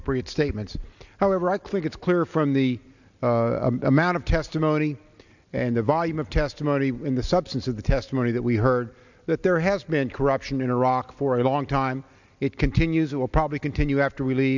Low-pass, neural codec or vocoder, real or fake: 7.2 kHz; none; real